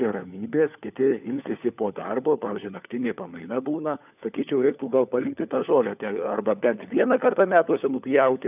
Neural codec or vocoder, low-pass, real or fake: codec, 16 kHz, 4 kbps, FunCodec, trained on LibriTTS, 50 frames a second; 3.6 kHz; fake